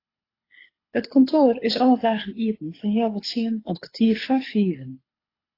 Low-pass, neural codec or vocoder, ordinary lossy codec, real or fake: 5.4 kHz; codec, 24 kHz, 6 kbps, HILCodec; AAC, 24 kbps; fake